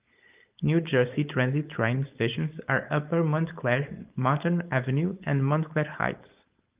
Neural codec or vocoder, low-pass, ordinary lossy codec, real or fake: codec, 16 kHz, 4.8 kbps, FACodec; 3.6 kHz; Opus, 24 kbps; fake